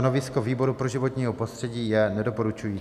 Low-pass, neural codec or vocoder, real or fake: 14.4 kHz; none; real